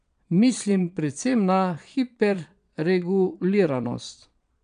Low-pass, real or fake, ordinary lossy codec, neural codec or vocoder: 9.9 kHz; fake; none; vocoder, 22.05 kHz, 80 mel bands, Vocos